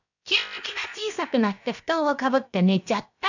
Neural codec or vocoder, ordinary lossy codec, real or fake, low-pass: codec, 16 kHz, about 1 kbps, DyCAST, with the encoder's durations; none; fake; 7.2 kHz